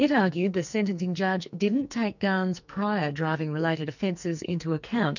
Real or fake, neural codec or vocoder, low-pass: fake; codec, 44.1 kHz, 2.6 kbps, SNAC; 7.2 kHz